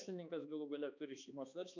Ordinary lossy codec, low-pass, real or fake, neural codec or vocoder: MP3, 64 kbps; 7.2 kHz; fake; codec, 16 kHz, 2 kbps, X-Codec, HuBERT features, trained on balanced general audio